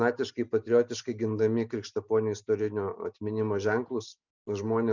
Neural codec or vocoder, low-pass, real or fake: none; 7.2 kHz; real